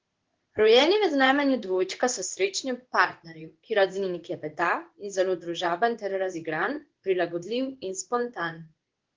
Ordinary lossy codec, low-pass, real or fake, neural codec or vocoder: Opus, 16 kbps; 7.2 kHz; fake; codec, 16 kHz in and 24 kHz out, 1 kbps, XY-Tokenizer